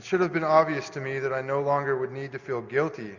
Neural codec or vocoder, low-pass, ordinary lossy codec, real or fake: none; 7.2 kHz; MP3, 64 kbps; real